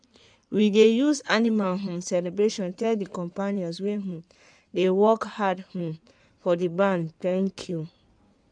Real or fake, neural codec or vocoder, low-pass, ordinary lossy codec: fake; codec, 16 kHz in and 24 kHz out, 2.2 kbps, FireRedTTS-2 codec; 9.9 kHz; none